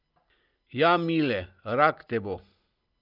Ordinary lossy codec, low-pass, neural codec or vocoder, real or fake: Opus, 24 kbps; 5.4 kHz; none; real